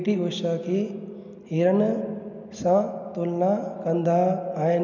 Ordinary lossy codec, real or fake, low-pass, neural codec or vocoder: none; real; 7.2 kHz; none